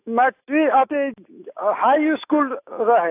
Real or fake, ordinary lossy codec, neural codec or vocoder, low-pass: fake; AAC, 24 kbps; autoencoder, 48 kHz, 128 numbers a frame, DAC-VAE, trained on Japanese speech; 3.6 kHz